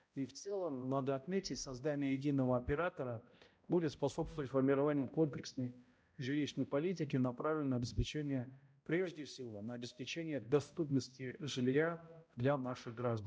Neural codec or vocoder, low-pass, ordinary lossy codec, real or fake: codec, 16 kHz, 0.5 kbps, X-Codec, HuBERT features, trained on balanced general audio; none; none; fake